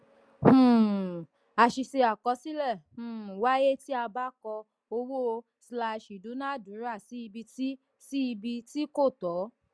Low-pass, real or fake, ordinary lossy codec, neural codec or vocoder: none; real; none; none